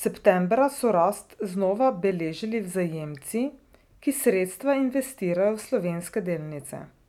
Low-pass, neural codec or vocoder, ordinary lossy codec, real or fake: 19.8 kHz; none; none; real